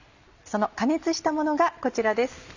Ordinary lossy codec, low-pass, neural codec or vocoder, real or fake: Opus, 64 kbps; 7.2 kHz; none; real